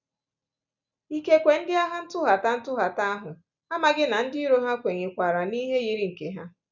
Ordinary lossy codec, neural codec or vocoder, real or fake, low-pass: none; none; real; 7.2 kHz